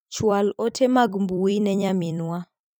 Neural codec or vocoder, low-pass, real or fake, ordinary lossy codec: vocoder, 44.1 kHz, 128 mel bands every 256 samples, BigVGAN v2; none; fake; none